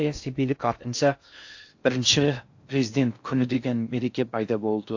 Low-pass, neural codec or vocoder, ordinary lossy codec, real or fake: 7.2 kHz; codec, 16 kHz in and 24 kHz out, 0.6 kbps, FocalCodec, streaming, 4096 codes; AAC, 48 kbps; fake